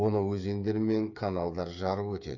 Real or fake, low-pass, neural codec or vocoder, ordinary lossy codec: fake; 7.2 kHz; codec, 16 kHz, 8 kbps, FreqCodec, smaller model; none